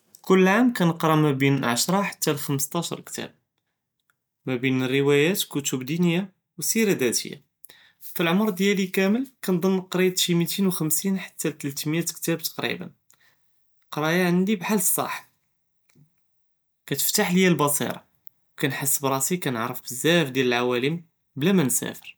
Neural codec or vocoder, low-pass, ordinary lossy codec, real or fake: none; none; none; real